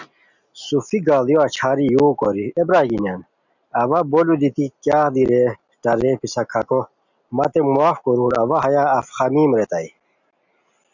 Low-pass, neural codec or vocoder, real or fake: 7.2 kHz; none; real